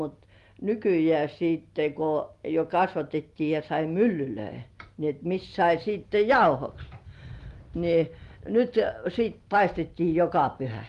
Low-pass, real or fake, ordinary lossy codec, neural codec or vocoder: 10.8 kHz; real; Opus, 24 kbps; none